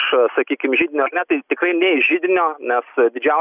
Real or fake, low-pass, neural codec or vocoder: real; 3.6 kHz; none